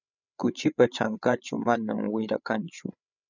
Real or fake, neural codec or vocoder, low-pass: fake; codec, 16 kHz, 8 kbps, FreqCodec, larger model; 7.2 kHz